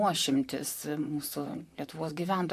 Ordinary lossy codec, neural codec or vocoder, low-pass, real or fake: AAC, 96 kbps; vocoder, 44.1 kHz, 128 mel bands, Pupu-Vocoder; 14.4 kHz; fake